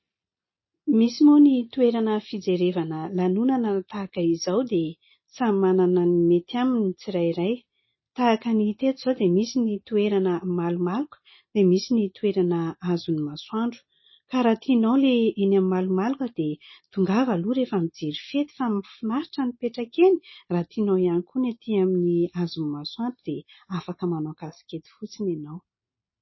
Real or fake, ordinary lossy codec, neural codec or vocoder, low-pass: real; MP3, 24 kbps; none; 7.2 kHz